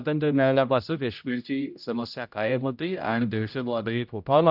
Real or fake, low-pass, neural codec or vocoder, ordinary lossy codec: fake; 5.4 kHz; codec, 16 kHz, 0.5 kbps, X-Codec, HuBERT features, trained on general audio; none